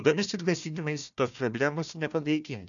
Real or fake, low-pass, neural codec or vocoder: fake; 7.2 kHz; codec, 16 kHz, 1 kbps, FunCodec, trained on Chinese and English, 50 frames a second